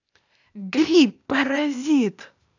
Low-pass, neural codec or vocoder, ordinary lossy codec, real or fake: 7.2 kHz; codec, 16 kHz, 0.8 kbps, ZipCodec; none; fake